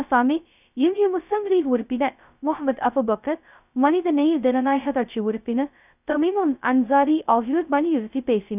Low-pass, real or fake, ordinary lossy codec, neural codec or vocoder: 3.6 kHz; fake; none; codec, 16 kHz, 0.2 kbps, FocalCodec